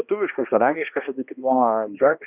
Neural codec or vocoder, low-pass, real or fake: codec, 16 kHz, 1 kbps, X-Codec, HuBERT features, trained on balanced general audio; 3.6 kHz; fake